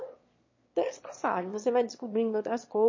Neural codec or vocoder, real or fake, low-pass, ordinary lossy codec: autoencoder, 22.05 kHz, a latent of 192 numbers a frame, VITS, trained on one speaker; fake; 7.2 kHz; MP3, 32 kbps